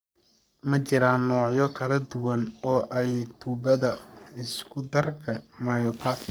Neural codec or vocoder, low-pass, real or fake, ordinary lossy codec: codec, 44.1 kHz, 3.4 kbps, Pupu-Codec; none; fake; none